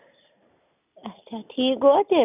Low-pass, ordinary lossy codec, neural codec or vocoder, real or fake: 3.6 kHz; none; none; real